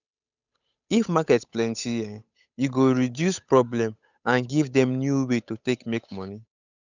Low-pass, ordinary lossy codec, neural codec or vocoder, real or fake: 7.2 kHz; none; codec, 16 kHz, 8 kbps, FunCodec, trained on Chinese and English, 25 frames a second; fake